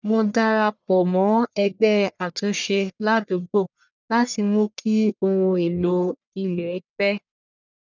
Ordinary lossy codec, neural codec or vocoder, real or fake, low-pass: none; codec, 44.1 kHz, 1.7 kbps, Pupu-Codec; fake; 7.2 kHz